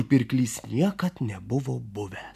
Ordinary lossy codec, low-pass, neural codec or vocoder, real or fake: AAC, 96 kbps; 14.4 kHz; none; real